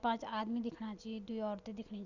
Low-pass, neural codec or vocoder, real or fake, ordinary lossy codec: 7.2 kHz; none; real; none